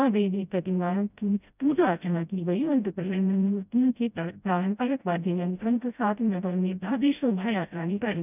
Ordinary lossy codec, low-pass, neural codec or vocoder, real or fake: none; 3.6 kHz; codec, 16 kHz, 0.5 kbps, FreqCodec, smaller model; fake